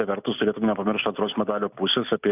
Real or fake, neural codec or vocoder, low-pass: real; none; 3.6 kHz